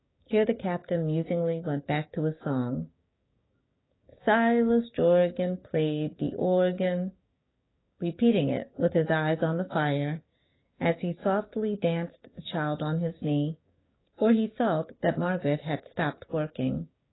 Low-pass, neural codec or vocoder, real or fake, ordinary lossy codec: 7.2 kHz; codec, 16 kHz, 6 kbps, DAC; fake; AAC, 16 kbps